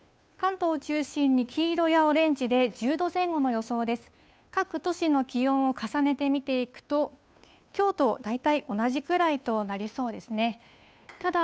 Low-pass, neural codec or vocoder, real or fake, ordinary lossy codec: none; codec, 16 kHz, 2 kbps, FunCodec, trained on Chinese and English, 25 frames a second; fake; none